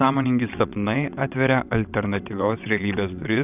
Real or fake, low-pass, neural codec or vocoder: fake; 3.6 kHz; vocoder, 22.05 kHz, 80 mel bands, WaveNeXt